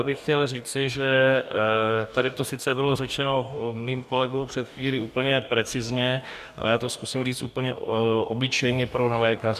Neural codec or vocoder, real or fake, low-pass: codec, 44.1 kHz, 2.6 kbps, DAC; fake; 14.4 kHz